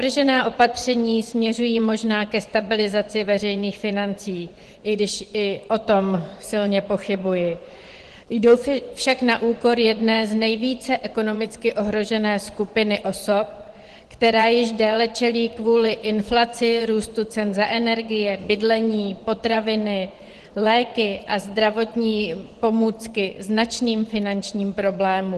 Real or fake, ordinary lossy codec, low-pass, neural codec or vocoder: fake; Opus, 16 kbps; 10.8 kHz; vocoder, 24 kHz, 100 mel bands, Vocos